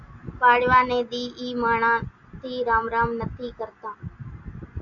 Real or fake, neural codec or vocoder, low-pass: real; none; 7.2 kHz